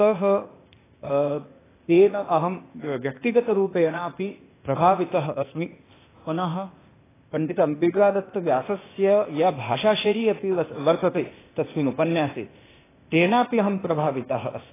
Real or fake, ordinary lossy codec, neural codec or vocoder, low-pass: fake; AAC, 16 kbps; codec, 16 kHz, 0.8 kbps, ZipCodec; 3.6 kHz